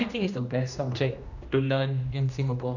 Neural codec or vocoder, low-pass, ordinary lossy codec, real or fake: codec, 16 kHz, 1 kbps, X-Codec, HuBERT features, trained on general audio; 7.2 kHz; none; fake